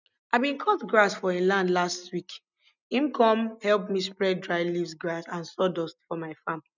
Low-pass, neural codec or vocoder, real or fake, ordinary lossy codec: 7.2 kHz; none; real; none